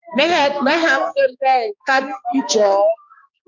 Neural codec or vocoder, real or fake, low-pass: codec, 16 kHz, 2 kbps, X-Codec, HuBERT features, trained on balanced general audio; fake; 7.2 kHz